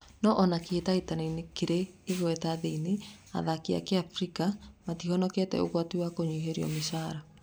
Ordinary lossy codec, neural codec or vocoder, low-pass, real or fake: none; none; none; real